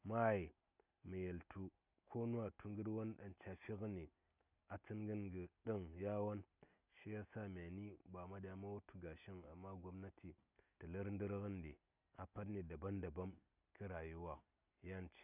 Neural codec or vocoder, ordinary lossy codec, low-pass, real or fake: none; none; 3.6 kHz; real